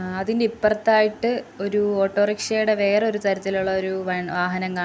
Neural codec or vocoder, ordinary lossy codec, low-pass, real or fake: none; none; none; real